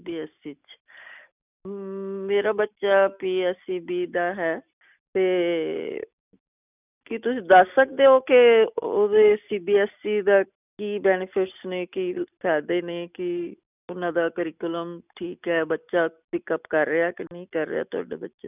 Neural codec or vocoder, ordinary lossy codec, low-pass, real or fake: none; none; 3.6 kHz; real